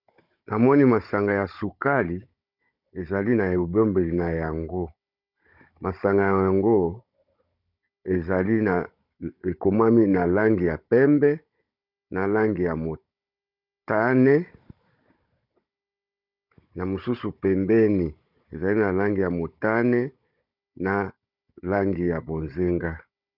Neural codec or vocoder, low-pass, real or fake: codec, 16 kHz, 16 kbps, FunCodec, trained on Chinese and English, 50 frames a second; 5.4 kHz; fake